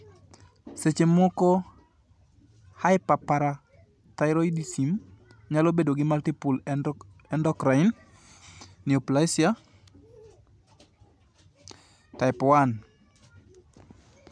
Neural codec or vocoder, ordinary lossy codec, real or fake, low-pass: none; none; real; none